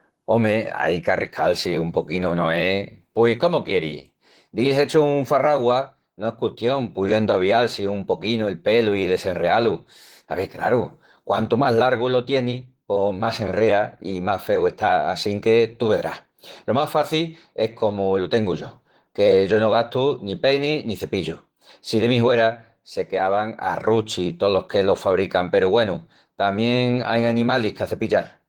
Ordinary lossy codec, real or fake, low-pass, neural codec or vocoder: Opus, 16 kbps; fake; 19.8 kHz; vocoder, 44.1 kHz, 128 mel bands, Pupu-Vocoder